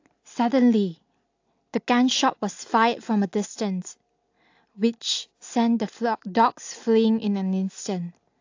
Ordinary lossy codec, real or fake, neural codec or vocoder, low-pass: AAC, 48 kbps; real; none; 7.2 kHz